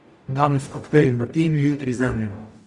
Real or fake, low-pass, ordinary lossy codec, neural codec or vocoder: fake; 10.8 kHz; Opus, 64 kbps; codec, 44.1 kHz, 0.9 kbps, DAC